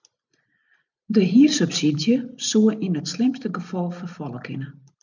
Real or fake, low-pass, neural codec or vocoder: real; 7.2 kHz; none